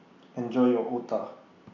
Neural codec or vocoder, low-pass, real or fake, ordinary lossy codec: none; 7.2 kHz; real; AAC, 48 kbps